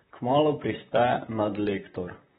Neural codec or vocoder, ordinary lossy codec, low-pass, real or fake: vocoder, 44.1 kHz, 128 mel bands, Pupu-Vocoder; AAC, 16 kbps; 19.8 kHz; fake